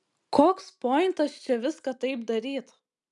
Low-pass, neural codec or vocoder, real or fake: 10.8 kHz; none; real